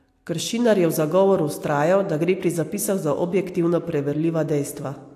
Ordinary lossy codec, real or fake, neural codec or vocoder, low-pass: AAC, 64 kbps; real; none; 14.4 kHz